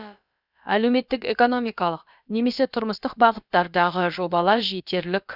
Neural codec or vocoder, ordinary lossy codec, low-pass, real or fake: codec, 16 kHz, about 1 kbps, DyCAST, with the encoder's durations; none; 5.4 kHz; fake